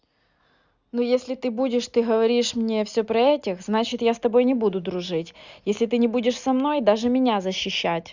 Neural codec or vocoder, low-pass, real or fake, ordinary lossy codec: none; 7.2 kHz; real; Opus, 64 kbps